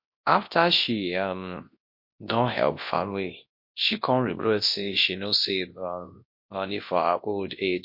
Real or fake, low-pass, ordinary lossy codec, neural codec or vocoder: fake; 5.4 kHz; MP3, 32 kbps; codec, 24 kHz, 0.9 kbps, WavTokenizer, large speech release